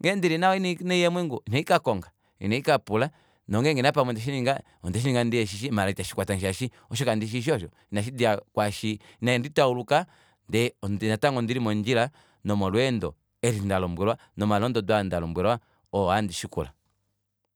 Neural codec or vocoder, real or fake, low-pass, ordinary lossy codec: none; real; none; none